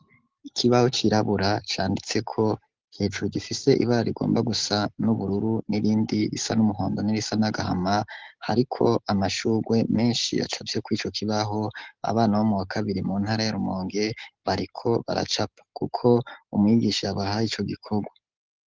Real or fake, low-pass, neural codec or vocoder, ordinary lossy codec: real; 7.2 kHz; none; Opus, 16 kbps